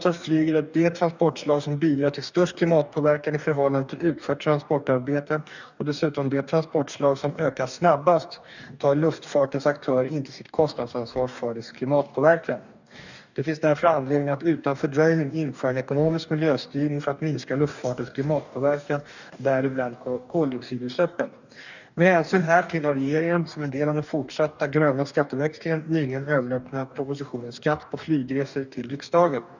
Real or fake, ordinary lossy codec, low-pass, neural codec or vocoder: fake; none; 7.2 kHz; codec, 44.1 kHz, 2.6 kbps, DAC